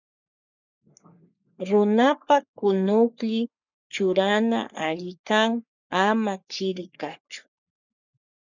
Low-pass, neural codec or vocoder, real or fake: 7.2 kHz; codec, 44.1 kHz, 3.4 kbps, Pupu-Codec; fake